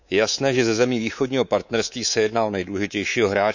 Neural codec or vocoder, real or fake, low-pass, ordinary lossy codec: codec, 24 kHz, 3.1 kbps, DualCodec; fake; 7.2 kHz; none